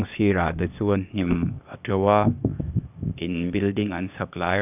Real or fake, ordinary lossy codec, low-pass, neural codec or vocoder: fake; none; 3.6 kHz; codec, 16 kHz, 0.8 kbps, ZipCodec